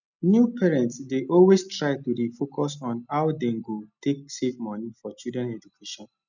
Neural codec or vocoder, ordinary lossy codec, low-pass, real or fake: none; none; 7.2 kHz; real